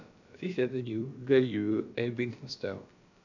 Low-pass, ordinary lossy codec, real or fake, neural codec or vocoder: 7.2 kHz; none; fake; codec, 16 kHz, about 1 kbps, DyCAST, with the encoder's durations